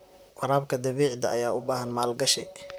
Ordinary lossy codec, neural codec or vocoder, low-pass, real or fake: none; vocoder, 44.1 kHz, 128 mel bands, Pupu-Vocoder; none; fake